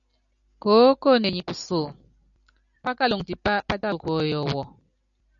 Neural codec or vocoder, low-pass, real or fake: none; 7.2 kHz; real